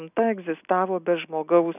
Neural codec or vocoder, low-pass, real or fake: none; 3.6 kHz; real